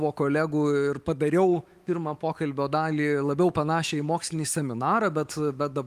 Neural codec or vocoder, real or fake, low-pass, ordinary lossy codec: autoencoder, 48 kHz, 128 numbers a frame, DAC-VAE, trained on Japanese speech; fake; 14.4 kHz; Opus, 32 kbps